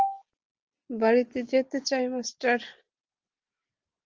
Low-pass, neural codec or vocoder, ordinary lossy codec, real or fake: 7.2 kHz; none; Opus, 24 kbps; real